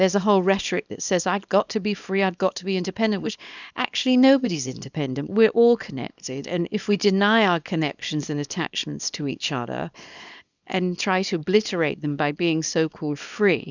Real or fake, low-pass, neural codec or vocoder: fake; 7.2 kHz; codec, 24 kHz, 0.9 kbps, WavTokenizer, small release